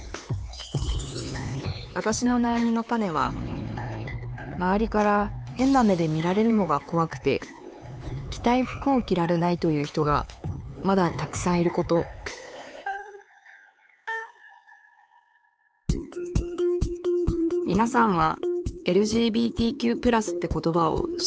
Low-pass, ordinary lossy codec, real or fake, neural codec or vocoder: none; none; fake; codec, 16 kHz, 4 kbps, X-Codec, HuBERT features, trained on LibriSpeech